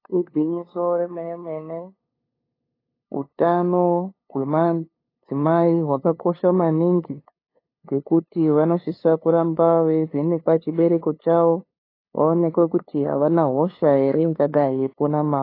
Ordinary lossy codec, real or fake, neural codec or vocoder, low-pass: AAC, 24 kbps; fake; codec, 16 kHz, 2 kbps, FunCodec, trained on LibriTTS, 25 frames a second; 5.4 kHz